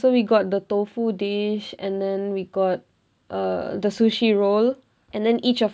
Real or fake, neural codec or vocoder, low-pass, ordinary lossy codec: real; none; none; none